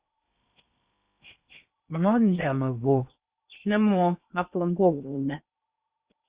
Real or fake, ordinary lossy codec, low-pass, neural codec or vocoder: fake; Opus, 64 kbps; 3.6 kHz; codec, 16 kHz in and 24 kHz out, 0.8 kbps, FocalCodec, streaming, 65536 codes